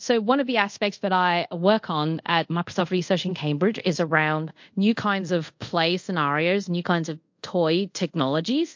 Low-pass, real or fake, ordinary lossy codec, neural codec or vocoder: 7.2 kHz; fake; MP3, 48 kbps; codec, 24 kHz, 0.5 kbps, DualCodec